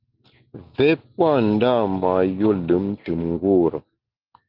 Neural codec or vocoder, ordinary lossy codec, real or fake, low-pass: none; Opus, 32 kbps; real; 5.4 kHz